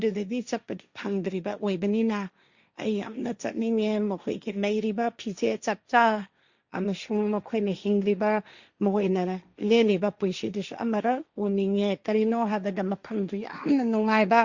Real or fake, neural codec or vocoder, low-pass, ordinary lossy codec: fake; codec, 16 kHz, 1.1 kbps, Voila-Tokenizer; 7.2 kHz; Opus, 64 kbps